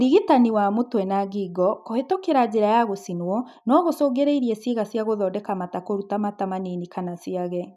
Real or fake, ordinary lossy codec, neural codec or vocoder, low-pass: real; none; none; 14.4 kHz